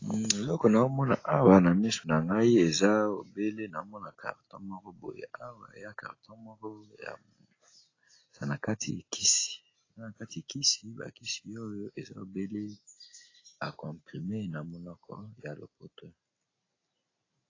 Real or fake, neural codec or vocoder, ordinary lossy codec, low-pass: real; none; AAC, 32 kbps; 7.2 kHz